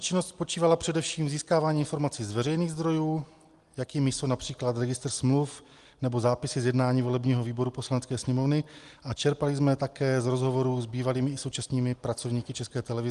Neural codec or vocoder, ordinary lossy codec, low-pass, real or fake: none; Opus, 24 kbps; 10.8 kHz; real